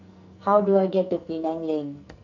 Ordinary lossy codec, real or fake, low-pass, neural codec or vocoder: none; fake; 7.2 kHz; codec, 44.1 kHz, 2.6 kbps, SNAC